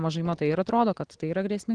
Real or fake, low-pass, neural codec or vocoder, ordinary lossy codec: real; 9.9 kHz; none; Opus, 16 kbps